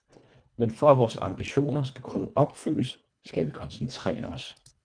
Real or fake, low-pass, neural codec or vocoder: fake; 9.9 kHz; codec, 24 kHz, 1.5 kbps, HILCodec